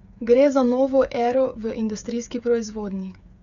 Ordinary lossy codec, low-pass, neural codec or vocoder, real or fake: none; 7.2 kHz; codec, 16 kHz, 8 kbps, FreqCodec, smaller model; fake